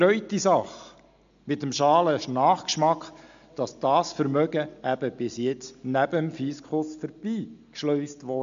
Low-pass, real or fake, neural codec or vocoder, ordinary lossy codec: 7.2 kHz; real; none; MP3, 64 kbps